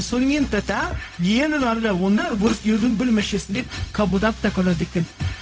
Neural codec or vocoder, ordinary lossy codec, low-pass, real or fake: codec, 16 kHz, 0.4 kbps, LongCat-Audio-Codec; none; none; fake